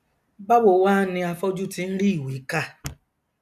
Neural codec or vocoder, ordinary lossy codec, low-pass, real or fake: none; none; 14.4 kHz; real